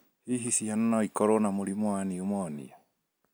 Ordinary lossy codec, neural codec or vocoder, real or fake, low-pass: none; none; real; none